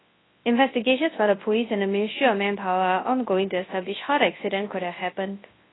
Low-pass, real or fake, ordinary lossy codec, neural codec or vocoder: 7.2 kHz; fake; AAC, 16 kbps; codec, 24 kHz, 0.9 kbps, WavTokenizer, large speech release